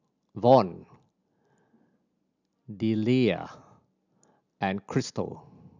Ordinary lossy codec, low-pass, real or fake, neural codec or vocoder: none; 7.2 kHz; real; none